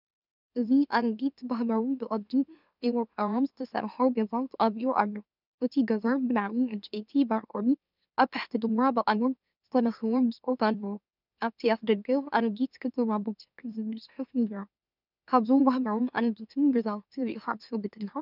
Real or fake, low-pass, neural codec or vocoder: fake; 5.4 kHz; autoencoder, 44.1 kHz, a latent of 192 numbers a frame, MeloTTS